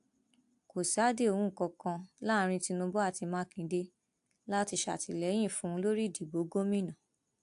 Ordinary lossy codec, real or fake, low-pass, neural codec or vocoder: AAC, 64 kbps; real; 10.8 kHz; none